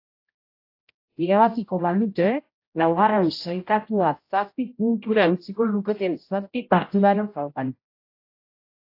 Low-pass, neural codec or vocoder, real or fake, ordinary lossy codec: 5.4 kHz; codec, 16 kHz, 0.5 kbps, X-Codec, HuBERT features, trained on general audio; fake; AAC, 32 kbps